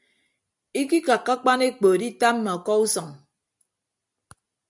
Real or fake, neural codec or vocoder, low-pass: real; none; 10.8 kHz